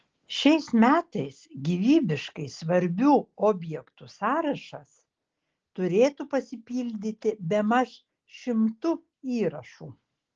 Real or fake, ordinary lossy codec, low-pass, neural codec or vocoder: real; Opus, 16 kbps; 7.2 kHz; none